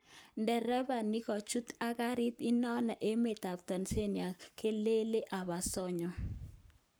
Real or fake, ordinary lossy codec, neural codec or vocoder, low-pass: fake; none; codec, 44.1 kHz, 7.8 kbps, Pupu-Codec; none